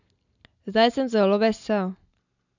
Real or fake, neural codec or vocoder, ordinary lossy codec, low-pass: real; none; none; 7.2 kHz